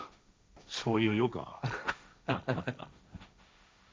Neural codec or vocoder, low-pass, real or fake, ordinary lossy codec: codec, 16 kHz, 1.1 kbps, Voila-Tokenizer; none; fake; none